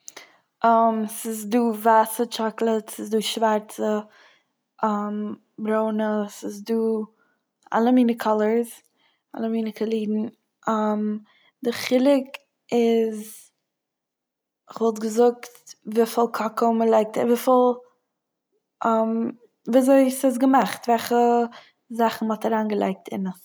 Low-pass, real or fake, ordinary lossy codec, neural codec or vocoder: none; real; none; none